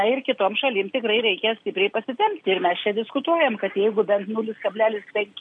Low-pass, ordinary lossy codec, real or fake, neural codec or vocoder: 9.9 kHz; MP3, 64 kbps; real; none